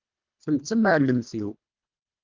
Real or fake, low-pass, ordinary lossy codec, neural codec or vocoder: fake; 7.2 kHz; Opus, 32 kbps; codec, 24 kHz, 1.5 kbps, HILCodec